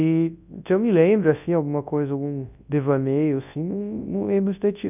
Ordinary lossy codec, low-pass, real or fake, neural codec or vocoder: none; 3.6 kHz; fake; codec, 24 kHz, 0.9 kbps, WavTokenizer, large speech release